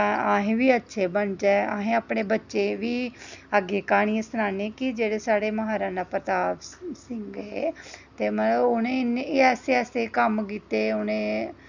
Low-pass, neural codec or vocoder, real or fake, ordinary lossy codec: 7.2 kHz; none; real; none